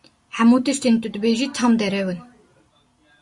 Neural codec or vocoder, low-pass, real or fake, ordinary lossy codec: none; 10.8 kHz; real; Opus, 64 kbps